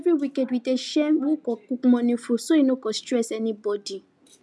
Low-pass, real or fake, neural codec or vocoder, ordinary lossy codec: none; real; none; none